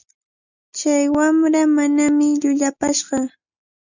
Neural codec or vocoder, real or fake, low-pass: none; real; 7.2 kHz